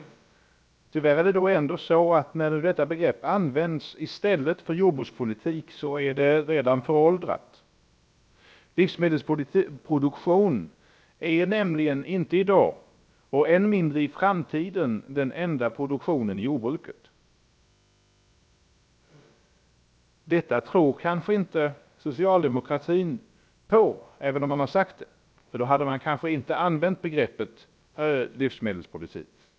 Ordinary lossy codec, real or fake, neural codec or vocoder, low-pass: none; fake; codec, 16 kHz, about 1 kbps, DyCAST, with the encoder's durations; none